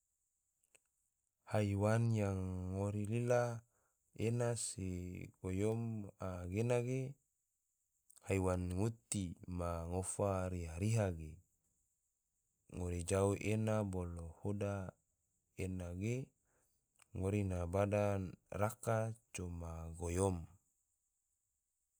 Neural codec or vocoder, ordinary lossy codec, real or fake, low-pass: none; none; real; none